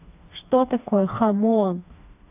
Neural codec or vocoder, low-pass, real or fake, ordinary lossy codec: codec, 16 kHz, 2 kbps, FreqCodec, smaller model; 3.6 kHz; fake; AAC, 32 kbps